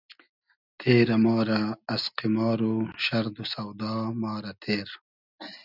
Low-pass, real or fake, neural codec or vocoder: 5.4 kHz; real; none